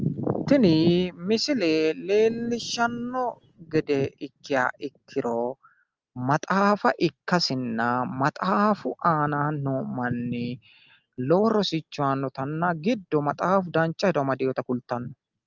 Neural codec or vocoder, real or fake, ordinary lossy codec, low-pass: none; real; Opus, 24 kbps; 7.2 kHz